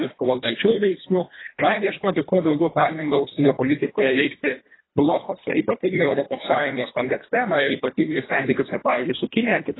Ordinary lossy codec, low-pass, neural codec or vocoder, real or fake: AAC, 16 kbps; 7.2 kHz; codec, 24 kHz, 1.5 kbps, HILCodec; fake